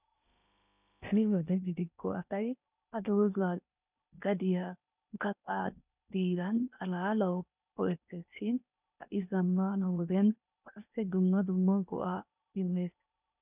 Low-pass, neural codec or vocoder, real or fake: 3.6 kHz; codec, 16 kHz in and 24 kHz out, 0.8 kbps, FocalCodec, streaming, 65536 codes; fake